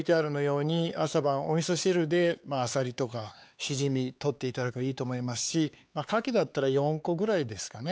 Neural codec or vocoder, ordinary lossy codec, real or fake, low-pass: codec, 16 kHz, 4 kbps, X-Codec, HuBERT features, trained on LibriSpeech; none; fake; none